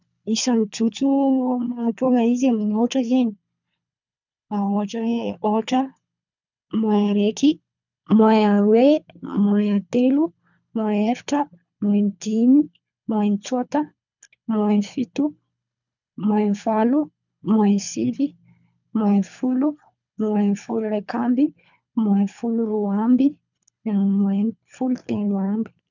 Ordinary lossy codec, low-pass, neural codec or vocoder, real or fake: none; 7.2 kHz; codec, 24 kHz, 3 kbps, HILCodec; fake